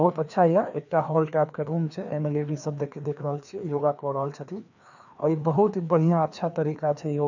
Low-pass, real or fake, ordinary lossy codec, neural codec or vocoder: 7.2 kHz; fake; none; codec, 16 kHz, 2 kbps, FreqCodec, larger model